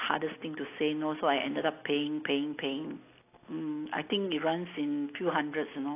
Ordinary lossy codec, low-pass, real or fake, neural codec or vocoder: AAC, 24 kbps; 3.6 kHz; real; none